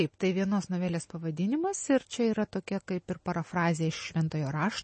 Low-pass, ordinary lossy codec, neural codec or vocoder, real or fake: 10.8 kHz; MP3, 32 kbps; none; real